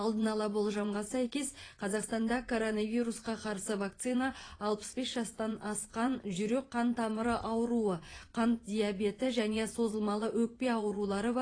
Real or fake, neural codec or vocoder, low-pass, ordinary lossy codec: fake; vocoder, 24 kHz, 100 mel bands, Vocos; 9.9 kHz; AAC, 32 kbps